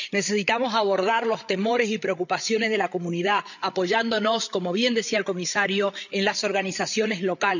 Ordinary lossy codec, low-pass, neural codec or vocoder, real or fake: none; 7.2 kHz; codec, 16 kHz, 8 kbps, FreqCodec, larger model; fake